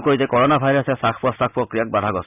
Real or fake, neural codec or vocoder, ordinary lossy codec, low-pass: real; none; none; 3.6 kHz